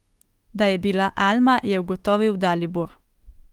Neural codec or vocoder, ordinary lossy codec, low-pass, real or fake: autoencoder, 48 kHz, 32 numbers a frame, DAC-VAE, trained on Japanese speech; Opus, 24 kbps; 19.8 kHz; fake